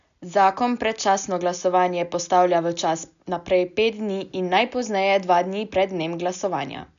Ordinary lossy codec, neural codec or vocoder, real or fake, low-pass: none; none; real; 7.2 kHz